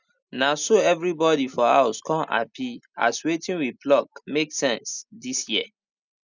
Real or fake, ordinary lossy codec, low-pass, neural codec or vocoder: real; none; 7.2 kHz; none